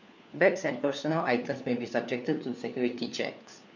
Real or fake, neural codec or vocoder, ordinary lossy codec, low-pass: fake; codec, 16 kHz, 4 kbps, FunCodec, trained on LibriTTS, 50 frames a second; none; 7.2 kHz